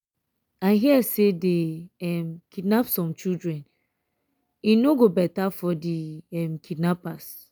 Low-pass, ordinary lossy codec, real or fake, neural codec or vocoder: none; none; real; none